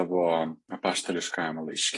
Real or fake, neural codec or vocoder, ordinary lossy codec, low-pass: real; none; AAC, 32 kbps; 10.8 kHz